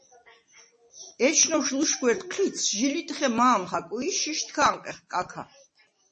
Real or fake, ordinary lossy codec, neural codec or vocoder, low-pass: fake; MP3, 32 kbps; vocoder, 44.1 kHz, 128 mel bands every 256 samples, BigVGAN v2; 10.8 kHz